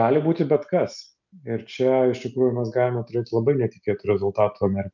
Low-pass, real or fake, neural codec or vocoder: 7.2 kHz; real; none